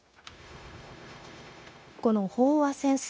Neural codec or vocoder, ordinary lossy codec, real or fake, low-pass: codec, 16 kHz, 0.9 kbps, LongCat-Audio-Codec; none; fake; none